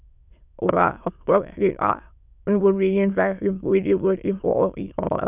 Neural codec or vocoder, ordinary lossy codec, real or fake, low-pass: autoencoder, 22.05 kHz, a latent of 192 numbers a frame, VITS, trained on many speakers; none; fake; 3.6 kHz